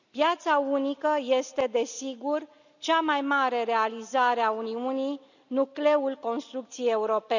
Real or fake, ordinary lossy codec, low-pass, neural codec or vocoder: real; none; 7.2 kHz; none